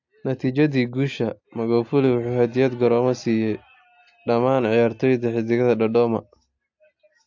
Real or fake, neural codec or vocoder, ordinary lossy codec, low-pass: real; none; AAC, 48 kbps; 7.2 kHz